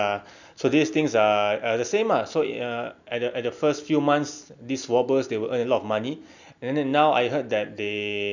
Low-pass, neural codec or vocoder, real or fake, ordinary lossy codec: 7.2 kHz; none; real; none